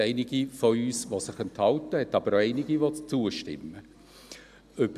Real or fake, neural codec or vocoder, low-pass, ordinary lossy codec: real; none; 14.4 kHz; none